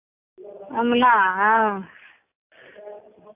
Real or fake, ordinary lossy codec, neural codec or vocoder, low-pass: real; AAC, 32 kbps; none; 3.6 kHz